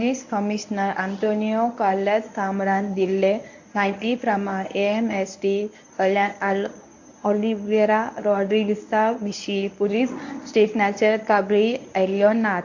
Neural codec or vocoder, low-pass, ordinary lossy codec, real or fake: codec, 24 kHz, 0.9 kbps, WavTokenizer, medium speech release version 1; 7.2 kHz; AAC, 48 kbps; fake